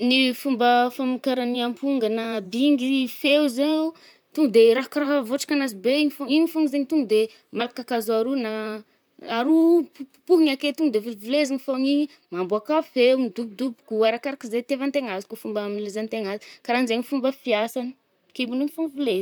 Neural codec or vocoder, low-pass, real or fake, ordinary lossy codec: vocoder, 44.1 kHz, 128 mel bands, Pupu-Vocoder; none; fake; none